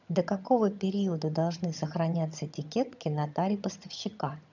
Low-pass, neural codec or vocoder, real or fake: 7.2 kHz; vocoder, 22.05 kHz, 80 mel bands, HiFi-GAN; fake